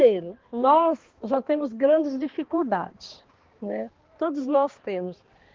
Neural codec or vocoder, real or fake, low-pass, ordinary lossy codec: codec, 16 kHz, 2 kbps, X-Codec, HuBERT features, trained on balanced general audio; fake; 7.2 kHz; Opus, 16 kbps